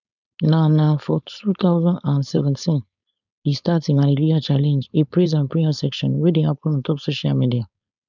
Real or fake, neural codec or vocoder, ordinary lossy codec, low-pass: fake; codec, 16 kHz, 4.8 kbps, FACodec; none; 7.2 kHz